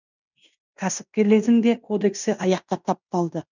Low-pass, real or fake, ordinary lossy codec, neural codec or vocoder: 7.2 kHz; fake; none; codec, 16 kHz in and 24 kHz out, 0.9 kbps, LongCat-Audio-Codec, fine tuned four codebook decoder